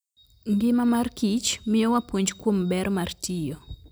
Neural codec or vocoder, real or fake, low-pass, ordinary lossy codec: none; real; none; none